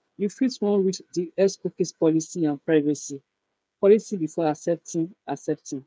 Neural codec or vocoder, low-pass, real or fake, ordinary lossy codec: codec, 16 kHz, 8 kbps, FreqCodec, smaller model; none; fake; none